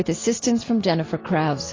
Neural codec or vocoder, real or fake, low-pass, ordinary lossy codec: none; real; 7.2 kHz; AAC, 32 kbps